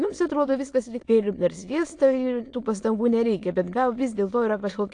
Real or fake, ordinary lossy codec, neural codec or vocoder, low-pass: fake; AAC, 48 kbps; autoencoder, 22.05 kHz, a latent of 192 numbers a frame, VITS, trained on many speakers; 9.9 kHz